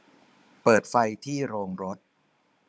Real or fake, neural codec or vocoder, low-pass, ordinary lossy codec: fake; codec, 16 kHz, 16 kbps, FunCodec, trained on Chinese and English, 50 frames a second; none; none